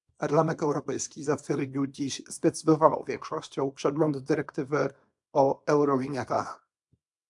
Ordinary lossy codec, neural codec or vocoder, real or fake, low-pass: AAC, 64 kbps; codec, 24 kHz, 0.9 kbps, WavTokenizer, small release; fake; 10.8 kHz